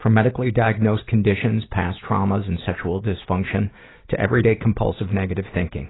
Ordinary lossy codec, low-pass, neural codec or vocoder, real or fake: AAC, 16 kbps; 7.2 kHz; none; real